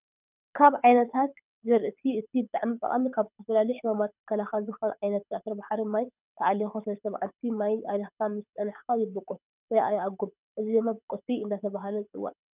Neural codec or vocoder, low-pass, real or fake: codec, 24 kHz, 6 kbps, HILCodec; 3.6 kHz; fake